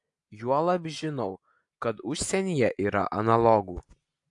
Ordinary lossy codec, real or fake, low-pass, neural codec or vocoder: AAC, 64 kbps; real; 10.8 kHz; none